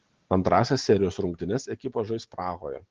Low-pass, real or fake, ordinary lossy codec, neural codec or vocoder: 7.2 kHz; real; Opus, 16 kbps; none